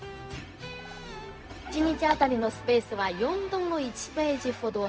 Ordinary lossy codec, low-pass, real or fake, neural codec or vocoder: none; none; fake; codec, 16 kHz, 0.4 kbps, LongCat-Audio-Codec